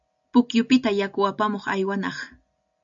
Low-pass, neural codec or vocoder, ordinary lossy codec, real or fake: 7.2 kHz; none; AAC, 64 kbps; real